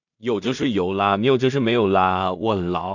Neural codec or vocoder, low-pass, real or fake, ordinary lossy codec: codec, 16 kHz in and 24 kHz out, 0.4 kbps, LongCat-Audio-Codec, two codebook decoder; 7.2 kHz; fake; none